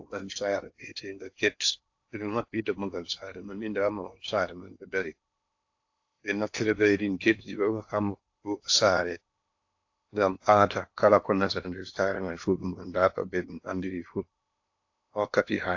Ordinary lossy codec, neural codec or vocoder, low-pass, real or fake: AAC, 48 kbps; codec, 16 kHz in and 24 kHz out, 0.8 kbps, FocalCodec, streaming, 65536 codes; 7.2 kHz; fake